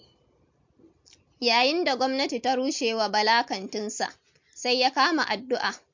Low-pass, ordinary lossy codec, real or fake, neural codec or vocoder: 7.2 kHz; MP3, 48 kbps; real; none